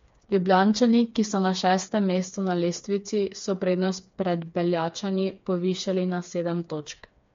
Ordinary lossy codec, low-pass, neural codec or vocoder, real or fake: MP3, 48 kbps; 7.2 kHz; codec, 16 kHz, 4 kbps, FreqCodec, smaller model; fake